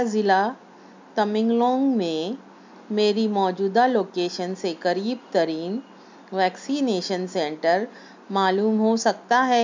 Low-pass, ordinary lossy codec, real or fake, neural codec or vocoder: 7.2 kHz; MP3, 64 kbps; real; none